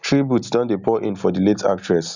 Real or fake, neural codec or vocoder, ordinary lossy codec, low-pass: real; none; none; 7.2 kHz